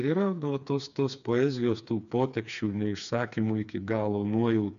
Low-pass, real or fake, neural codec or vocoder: 7.2 kHz; fake; codec, 16 kHz, 4 kbps, FreqCodec, smaller model